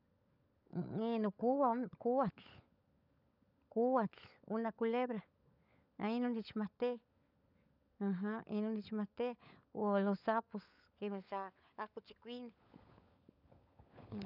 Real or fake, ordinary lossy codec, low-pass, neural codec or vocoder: fake; none; 5.4 kHz; codec, 16 kHz, 16 kbps, FunCodec, trained on LibriTTS, 50 frames a second